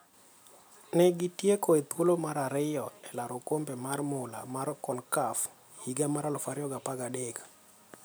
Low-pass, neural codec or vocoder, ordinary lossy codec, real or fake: none; none; none; real